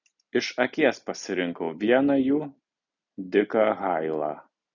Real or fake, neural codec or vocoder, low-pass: real; none; 7.2 kHz